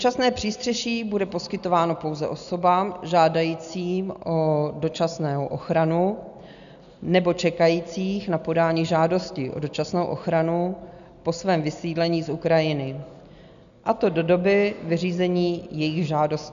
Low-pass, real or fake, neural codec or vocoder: 7.2 kHz; real; none